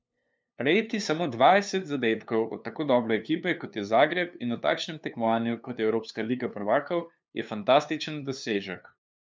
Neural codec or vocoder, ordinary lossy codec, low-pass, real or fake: codec, 16 kHz, 2 kbps, FunCodec, trained on LibriTTS, 25 frames a second; none; none; fake